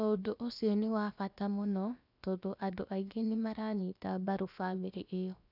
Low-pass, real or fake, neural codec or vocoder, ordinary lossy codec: 5.4 kHz; fake; codec, 16 kHz, about 1 kbps, DyCAST, with the encoder's durations; none